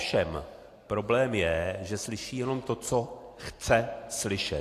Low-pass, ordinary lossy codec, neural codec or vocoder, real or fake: 14.4 kHz; AAC, 64 kbps; none; real